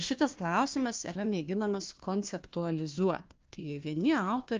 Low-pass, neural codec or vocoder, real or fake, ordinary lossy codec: 7.2 kHz; codec, 16 kHz, 1 kbps, FunCodec, trained on Chinese and English, 50 frames a second; fake; Opus, 24 kbps